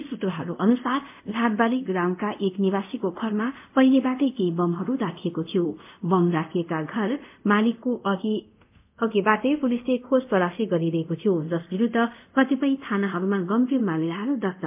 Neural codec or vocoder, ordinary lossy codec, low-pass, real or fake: codec, 24 kHz, 0.5 kbps, DualCodec; MP3, 32 kbps; 3.6 kHz; fake